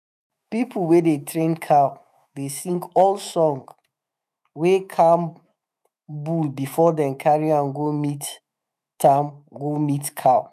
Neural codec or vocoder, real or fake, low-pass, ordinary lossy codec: autoencoder, 48 kHz, 128 numbers a frame, DAC-VAE, trained on Japanese speech; fake; 14.4 kHz; none